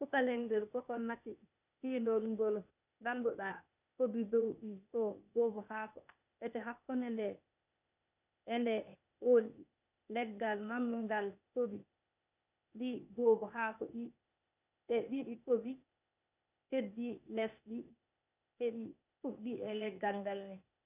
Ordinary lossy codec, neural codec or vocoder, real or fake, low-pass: none; codec, 16 kHz, 0.8 kbps, ZipCodec; fake; 3.6 kHz